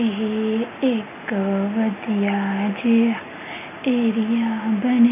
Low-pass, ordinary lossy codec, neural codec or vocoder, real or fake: 3.6 kHz; none; none; real